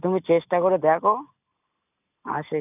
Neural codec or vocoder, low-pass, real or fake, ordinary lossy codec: none; 3.6 kHz; real; none